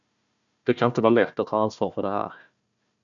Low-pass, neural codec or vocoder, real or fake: 7.2 kHz; codec, 16 kHz, 1 kbps, FunCodec, trained on Chinese and English, 50 frames a second; fake